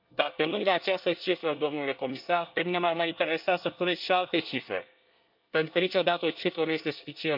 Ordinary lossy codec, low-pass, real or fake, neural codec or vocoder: none; 5.4 kHz; fake; codec, 24 kHz, 1 kbps, SNAC